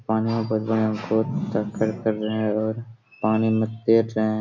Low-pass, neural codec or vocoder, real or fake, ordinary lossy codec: 7.2 kHz; none; real; none